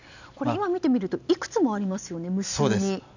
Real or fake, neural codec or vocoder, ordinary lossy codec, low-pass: real; none; none; 7.2 kHz